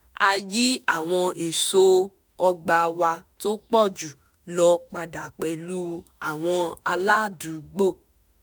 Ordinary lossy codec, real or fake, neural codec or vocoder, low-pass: none; fake; autoencoder, 48 kHz, 32 numbers a frame, DAC-VAE, trained on Japanese speech; none